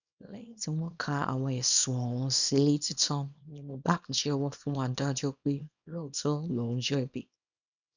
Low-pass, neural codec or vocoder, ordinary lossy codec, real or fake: 7.2 kHz; codec, 24 kHz, 0.9 kbps, WavTokenizer, small release; none; fake